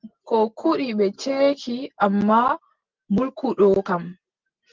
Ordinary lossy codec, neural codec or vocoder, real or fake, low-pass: Opus, 32 kbps; none; real; 7.2 kHz